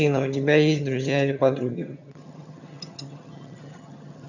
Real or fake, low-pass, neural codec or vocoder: fake; 7.2 kHz; vocoder, 22.05 kHz, 80 mel bands, HiFi-GAN